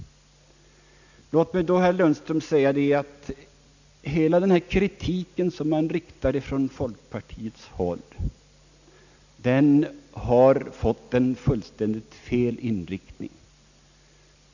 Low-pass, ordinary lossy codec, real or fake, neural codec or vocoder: 7.2 kHz; none; real; none